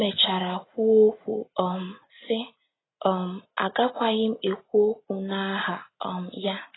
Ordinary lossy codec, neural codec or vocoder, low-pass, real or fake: AAC, 16 kbps; none; 7.2 kHz; real